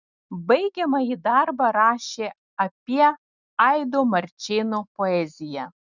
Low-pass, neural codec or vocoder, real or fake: 7.2 kHz; none; real